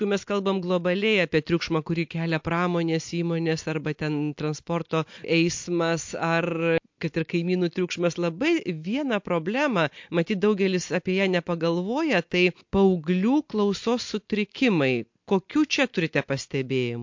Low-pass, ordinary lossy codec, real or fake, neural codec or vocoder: 7.2 kHz; MP3, 48 kbps; real; none